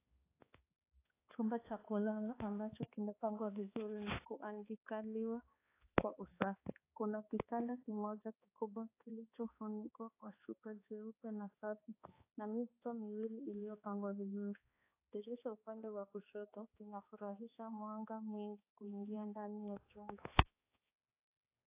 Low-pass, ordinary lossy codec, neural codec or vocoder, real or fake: 3.6 kHz; AAC, 24 kbps; codec, 16 kHz, 2 kbps, X-Codec, HuBERT features, trained on balanced general audio; fake